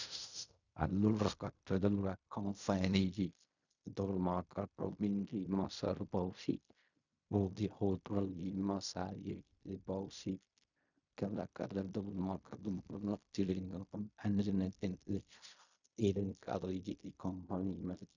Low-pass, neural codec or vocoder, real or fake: 7.2 kHz; codec, 16 kHz in and 24 kHz out, 0.4 kbps, LongCat-Audio-Codec, fine tuned four codebook decoder; fake